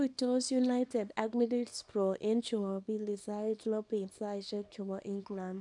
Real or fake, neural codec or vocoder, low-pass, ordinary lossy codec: fake; codec, 24 kHz, 0.9 kbps, WavTokenizer, small release; 10.8 kHz; none